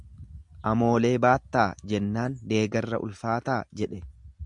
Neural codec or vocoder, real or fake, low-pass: none; real; 10.8 kHz